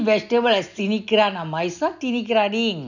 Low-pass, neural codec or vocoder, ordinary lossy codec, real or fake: 7.2 kHz; none; none; real